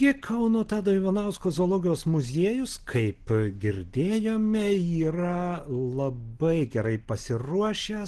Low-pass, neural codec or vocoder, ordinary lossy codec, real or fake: 10.8 kHz; none; Opus, 16 kbps; real